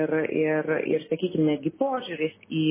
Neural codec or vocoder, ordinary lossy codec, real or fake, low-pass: none; MP3, 16 kbps; real; 3.6 kHz